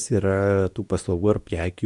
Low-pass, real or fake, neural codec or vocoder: 10.8 kHz; fake; codec, 24 kHz, 0.9 kbps, WavTokenizer, medium speech release version 2